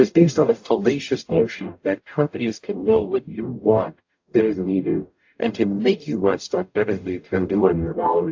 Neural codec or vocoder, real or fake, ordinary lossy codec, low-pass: codec, 44.1 kHz, 0.9 kbps, DAC; fake; AAC, 48 kbps; 7.2 kHz